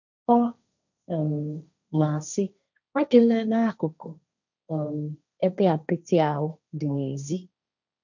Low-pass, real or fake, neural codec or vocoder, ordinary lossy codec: 7.2 kHz; fake; codec, 16 kHz, 1.1 kbps, Voila-Tokenizer; none